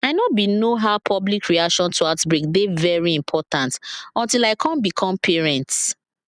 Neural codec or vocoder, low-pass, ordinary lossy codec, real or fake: none; 9.9 kHz; none; real